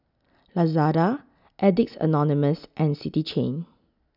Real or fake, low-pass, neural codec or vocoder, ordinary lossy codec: real; 5.4 kHz; none; none